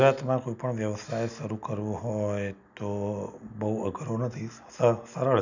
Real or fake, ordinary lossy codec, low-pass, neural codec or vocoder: real; none; 7.2 kHz; none